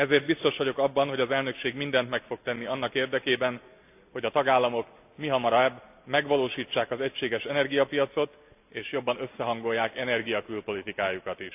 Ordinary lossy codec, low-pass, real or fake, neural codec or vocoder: AAC, 32 kbps; 3.6 kHz; real; none